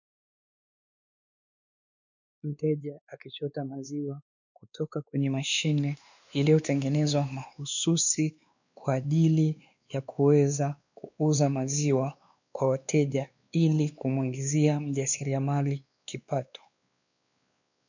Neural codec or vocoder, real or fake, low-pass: codec, 16 kHz, 2 kbps, X-Codec, WavLM features, trained on Multilingual LibriSpeech; fake; 7.2 kHz